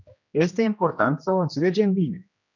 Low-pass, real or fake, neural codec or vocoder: 7.2 kHz; fake; codec, 16 kHz, 1 kbps, X-Codec, HuBERT features, trained on general audio